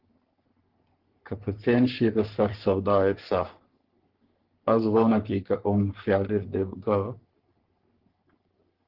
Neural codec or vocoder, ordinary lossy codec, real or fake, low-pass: codec, 44.1 kHz, 3.4 kbps, Pupu-Codec; Opus, 16 kbps; fake; 5.4 kHz